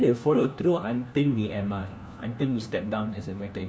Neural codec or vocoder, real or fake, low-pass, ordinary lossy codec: codec, 16 kHz, 1 kbps, FunCodec, trained on LibriTTS, 50 frames a second; fake; none; none